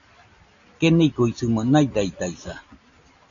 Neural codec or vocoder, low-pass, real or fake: none; 7.2 kHz; real